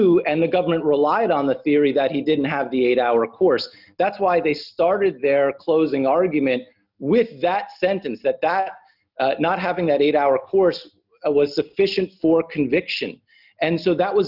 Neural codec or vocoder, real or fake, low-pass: none; real; 5.4 kHz